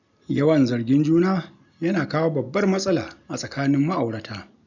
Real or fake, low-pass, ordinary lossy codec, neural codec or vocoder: real; 7.2 kHz; none; none